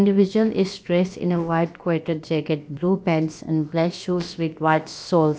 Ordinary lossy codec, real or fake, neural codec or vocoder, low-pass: none; fake; codec, 16 kHz, about 1 kbps, DyCAST, with the encoder's durations; none